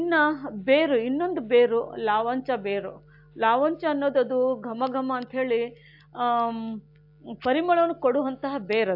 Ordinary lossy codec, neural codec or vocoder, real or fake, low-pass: AAC, 48 kbps; none; real; 5.4 kHz